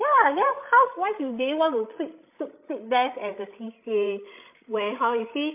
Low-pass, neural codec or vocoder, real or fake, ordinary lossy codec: 3.6 kHz; codec, 16 kHz, 16 kbps, FreqCodec, larger model; fake; MP3, 32 kbps